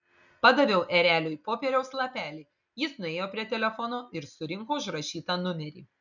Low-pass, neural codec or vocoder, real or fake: 7.2 kHz; none; real